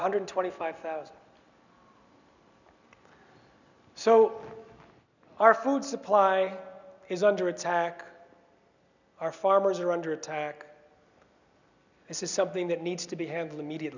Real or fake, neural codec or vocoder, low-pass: real; none; 7.2 kHz